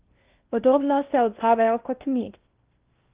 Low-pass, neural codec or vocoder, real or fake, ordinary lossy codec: 3.6 kHz; codec, 16 kHz in and 24 kHz out, 0.8 kbps, FocalCodec, streaming, 65536 codes; fake; Opus, 32 kbps